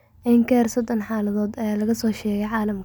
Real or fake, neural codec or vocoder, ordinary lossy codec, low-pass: real; none; none; none